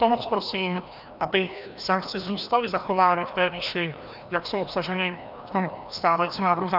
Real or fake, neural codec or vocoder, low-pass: fake; codec, 16 kHz, 1 kbps, FreqCodec, larger model; 5.4 kHz